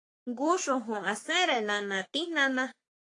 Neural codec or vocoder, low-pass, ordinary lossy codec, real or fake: codec, 44.1 kHz, 3.4 kbps, Pupu-Codec; 10.8 kHz; AAC, 48 kbps; fake